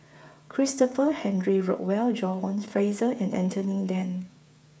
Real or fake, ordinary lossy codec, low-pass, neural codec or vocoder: fake; none; none; codec, 16 kHz, 8 kbps, FreqCodec, smaller model